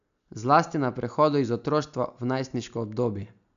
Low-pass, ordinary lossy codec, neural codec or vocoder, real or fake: 7.2 kHz; none; none; real